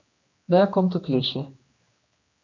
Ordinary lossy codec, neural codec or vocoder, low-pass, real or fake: MP3, 48 kbps; codec, 16 kHz, 2 kbps, X-Codec, HuBERT features, trained on general audio; 7.2 kHz; fake